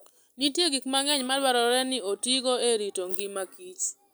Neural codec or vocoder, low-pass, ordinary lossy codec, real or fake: none; none; none; real